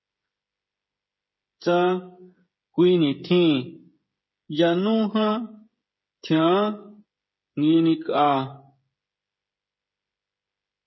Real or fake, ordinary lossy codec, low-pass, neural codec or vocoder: fake; MP3, 24 kbps; 7.2 kHz; codec, 16 kHz, 16 kbps, FreqCodec, smaller model